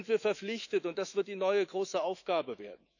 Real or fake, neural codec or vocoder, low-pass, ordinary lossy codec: fake; autoencoder, 48 kHz, 128 numbers a frame, DAC-VAE, trained on Japanese speech; 7.2 kHz; none